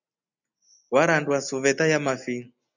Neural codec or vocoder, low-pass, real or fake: none; 7.2 kHz; real